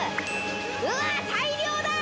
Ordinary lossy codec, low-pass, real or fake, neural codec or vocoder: none; none; real; none